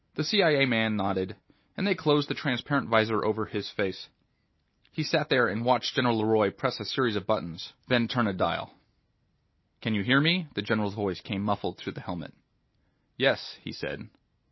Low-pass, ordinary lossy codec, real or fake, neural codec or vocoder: 7.2 kHz; MP3, 24 kbps; real; none